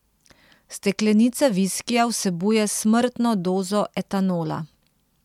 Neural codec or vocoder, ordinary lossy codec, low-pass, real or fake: none; MP3, 96 kbps; 19.8 kHz; real